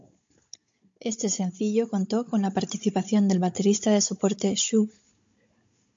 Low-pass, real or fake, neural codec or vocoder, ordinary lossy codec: 7.2 kHz; fake; codec, 16 kHz, 16 kbps, FunCodec, trained on Chinese and English, 50 frames a second; MP3, 48 kbps